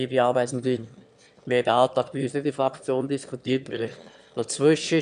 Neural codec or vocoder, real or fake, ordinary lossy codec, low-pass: autoencoder, 22.05 kHz, a latent of 192 numbers a frame, VITS, trained on one speaker; fake; none; 9.9 kHz